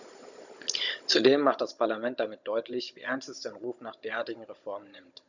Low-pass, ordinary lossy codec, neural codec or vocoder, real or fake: 7.2 kHz; none; codec, 16 kHz, 16 kbps, FunCodec, trained on Chinese and English, 50 frames a second; fake